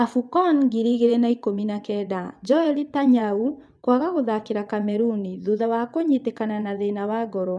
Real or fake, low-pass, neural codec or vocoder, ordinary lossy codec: fake; none; vocoder, 22.05 kHz, 80 mel bands, WaveNeXt; none